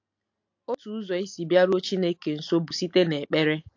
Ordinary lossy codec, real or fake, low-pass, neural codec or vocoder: AAC, 48 kbps; real; 7.2 kHz; none